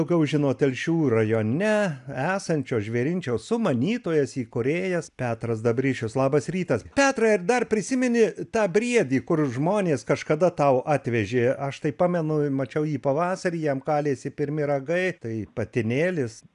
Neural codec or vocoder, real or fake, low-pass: none; real; 10.8 kHz